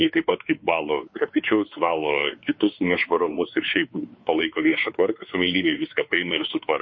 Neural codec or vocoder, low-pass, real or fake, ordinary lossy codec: codec, 16 kHz, 4 kbps, X-Codec, WavLM features, trained on Multilingual LibriSpeech; 7.2 kHz; fake; MP3, 32 kbps